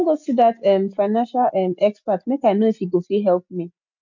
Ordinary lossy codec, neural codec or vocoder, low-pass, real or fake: none; none; 7.2 kHz; real